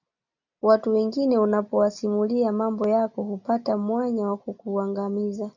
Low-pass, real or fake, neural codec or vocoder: 7.2 kHz; real; none